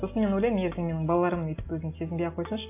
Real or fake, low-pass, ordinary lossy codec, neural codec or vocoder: real; 3.6 kHz; AAC, 32 kbps; none